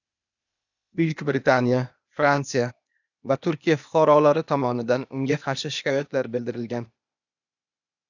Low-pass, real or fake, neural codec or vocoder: 7.2 kHz; fake; codec, 16 kHz, 0.8 kbps, ZipCodec